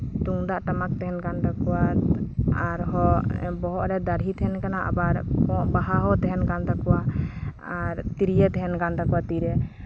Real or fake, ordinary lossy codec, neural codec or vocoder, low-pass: real; none; none; none